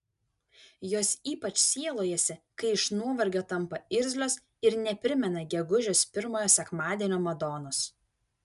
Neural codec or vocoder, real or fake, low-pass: none; real; 10.8 kHz